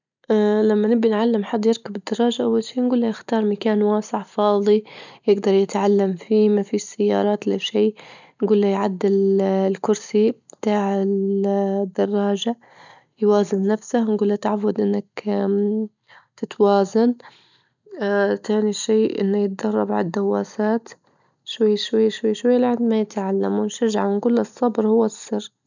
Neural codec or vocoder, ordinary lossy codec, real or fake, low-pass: none; none; real; 7.2 kHz